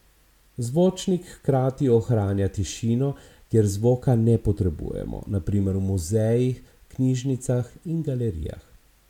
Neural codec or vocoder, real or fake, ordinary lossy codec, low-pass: none; real; MP3, 96 kbps; 19.8 kHz